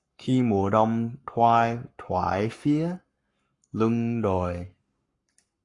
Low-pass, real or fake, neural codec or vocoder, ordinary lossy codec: 10.8 kHz; fake; codec, 44.1 kHz, 7.8 kbps, Pupu-Codec; AAC, 48 kbps